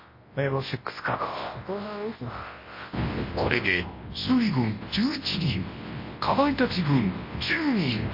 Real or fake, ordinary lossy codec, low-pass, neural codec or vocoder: fake; MP3, 24 kbps; 5.4 kHz; codec, 24 kHz, 0.9 kbps, WavTokenizer, large speech release